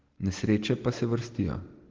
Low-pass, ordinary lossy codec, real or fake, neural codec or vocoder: 7.2 kHz; Opus, 16 kbps; real; none